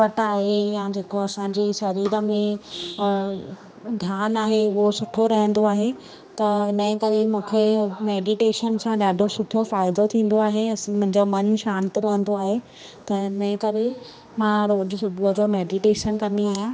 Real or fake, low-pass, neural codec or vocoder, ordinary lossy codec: fake; none; codec, 16 kHz, 2 kbps, X-Codec, HuBERT features, trained on general audio; none